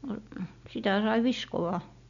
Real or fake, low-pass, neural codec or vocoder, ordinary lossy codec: real; 7.2 kHz; none; none